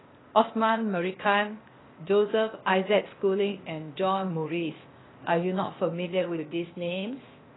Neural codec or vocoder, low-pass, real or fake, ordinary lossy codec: codec, 16 kHz, 0.8 kbps, ZipCodec; 7.2 kHz; fake; AAC, 16 kbps